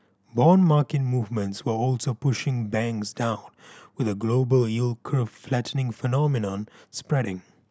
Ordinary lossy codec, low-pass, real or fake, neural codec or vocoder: none; none; real; none